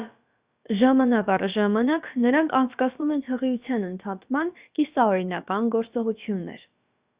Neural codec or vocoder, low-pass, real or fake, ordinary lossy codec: codec, 16 kHz, about 1 kbps, DyCAST, with the encoder's durations; 3.6 kHz; fake; Opus, 64 kbps